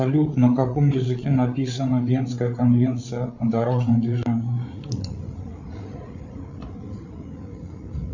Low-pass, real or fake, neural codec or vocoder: 7.2 kHz; fake; codec, 16 kHz, 8 kbps, FreqCodec, larger model